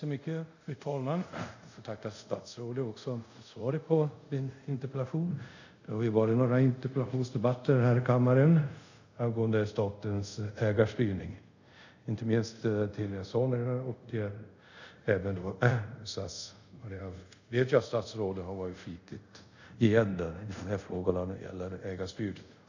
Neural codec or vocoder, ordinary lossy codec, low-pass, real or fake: codec, 24 kHz, 0.5 kbps, DualCodec; none; 7.2 kHz; fake